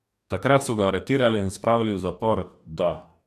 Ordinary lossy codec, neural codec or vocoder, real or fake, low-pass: none; codec, 44.1 kHz, 2.6 kbps, DAC; fake; 14.4 kHz